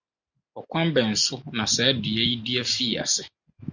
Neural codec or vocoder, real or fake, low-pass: none; real; 7.2 kHz